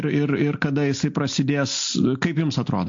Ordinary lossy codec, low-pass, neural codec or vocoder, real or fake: AAC, 48 kbps; 7.2 kHz; none; real